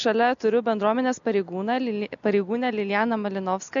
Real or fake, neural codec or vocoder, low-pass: real; none; 7.2 kHz